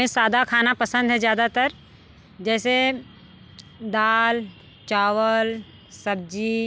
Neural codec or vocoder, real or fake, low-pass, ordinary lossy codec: none; real; none; none